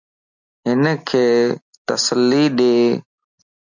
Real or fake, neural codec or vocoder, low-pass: real; none; 7.2 kHz